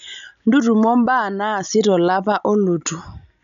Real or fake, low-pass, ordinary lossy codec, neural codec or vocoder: real; 7.2 kHz; none; none